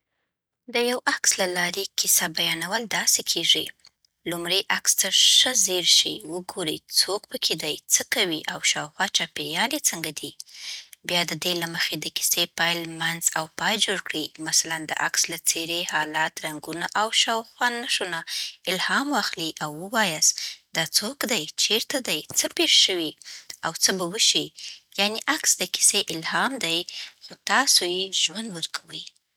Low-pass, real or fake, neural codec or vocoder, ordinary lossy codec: none; real; none; none